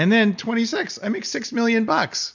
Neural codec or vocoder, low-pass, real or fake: none; 7.2 kHz; real